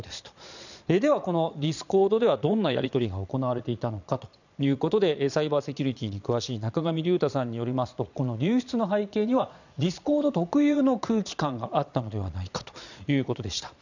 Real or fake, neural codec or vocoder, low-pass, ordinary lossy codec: fake; vocoder, 22.05 kHz, 80 mel bands, Vocos; 7.2 kHz; none